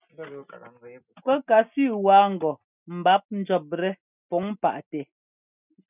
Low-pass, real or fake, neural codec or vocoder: 3.6 kHz; real; none